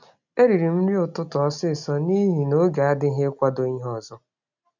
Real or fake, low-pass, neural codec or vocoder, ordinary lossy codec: real; 7.2 kHz; none; none